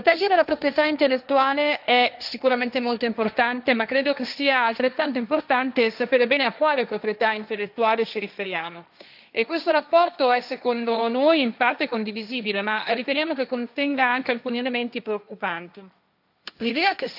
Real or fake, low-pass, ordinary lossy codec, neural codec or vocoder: fake; 5.4 kHz; none; codec, 16 kHz, 1.1 kbps, Voila-Tokenizer